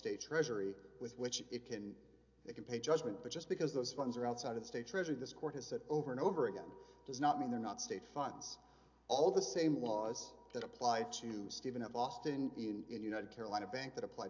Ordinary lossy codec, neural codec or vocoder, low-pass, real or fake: AAC, 48 kbps; none; 7.2 kHz; real